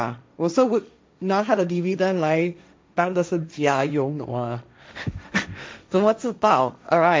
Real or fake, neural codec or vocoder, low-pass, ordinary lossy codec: fake; codec, 16 kHz, 1.1 kbps, Voila-Tokenizer; none; none